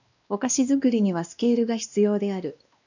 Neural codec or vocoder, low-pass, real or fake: codec, 16 kHz, 1 kbps, X-Codec, WavLM features, trained on Multilingual LibriSpeech; 7.2 kHz; fake